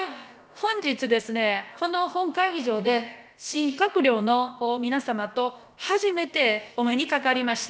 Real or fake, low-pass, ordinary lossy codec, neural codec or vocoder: fake; none; none; codec, 16 kHz, about 1 kbps, DyCAST, with the encoder's durations